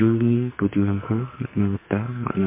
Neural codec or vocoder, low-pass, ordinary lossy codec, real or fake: codec, 44.1 kHz, 2.6 kbps, SNAC; 3.6 kHz; MP3, 24 kbps; fake